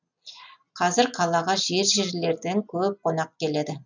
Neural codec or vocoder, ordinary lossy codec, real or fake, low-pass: none; none; real; 7.2 kHz